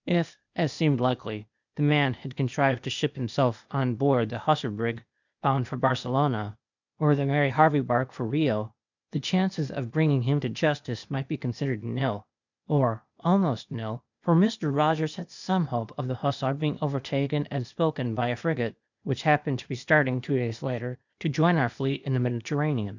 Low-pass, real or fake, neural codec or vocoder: 7.2 kHz; fake; codec, 16 kHz, 0.8 kbps, ZipCodec